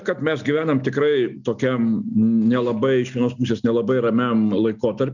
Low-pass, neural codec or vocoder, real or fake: 7.2 kHz; none; real